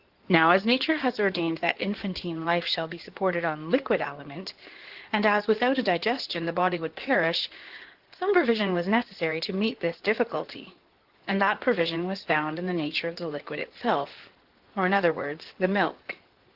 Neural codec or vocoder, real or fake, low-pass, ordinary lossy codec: codec, 16 kHz in and 24 kHz out, 2.2 kbps, FireRedTTS-2 codec; fake; 5.4 kHz; Opus, 16 kbps